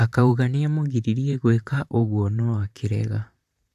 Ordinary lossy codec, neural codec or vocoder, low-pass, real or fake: none; vocoder, 44.1 kHz, 128 mel bands, Pupu-Vocoder; 14.4 kHz; fake